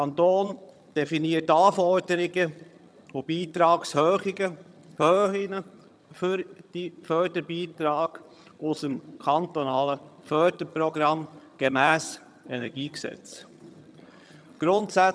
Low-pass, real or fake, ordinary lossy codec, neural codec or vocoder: none; fake; none; vocoder, 22.05 kHz, 80 mel bands, HiFi-GAN